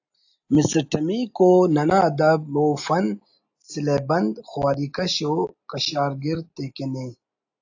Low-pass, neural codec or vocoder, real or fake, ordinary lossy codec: 7.2 kHz; none; real; AAC, 48 kbps